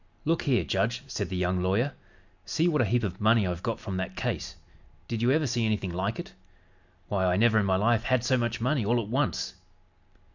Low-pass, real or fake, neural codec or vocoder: 7.2 kHz; real; none